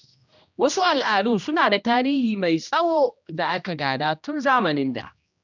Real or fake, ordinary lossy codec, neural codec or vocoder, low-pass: fake; none; codec, 16 kHz, 1 kbps, X-Codec, HuBERT features, trained on general audio; 7.2 kHz